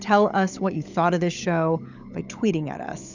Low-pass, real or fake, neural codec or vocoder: 7.2 kHz; fake; codec, 16 kHz, 16 kbps, FunCodec, trained on LibriTTS, 50 frames a second